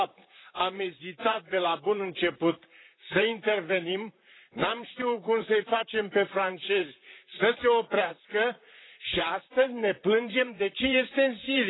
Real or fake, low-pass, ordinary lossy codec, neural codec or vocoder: fake; 7.2 kHz; AAC, 16 kbps; vocoder, 44.1 kHz, 128 mel bands, Pupu-Vocoder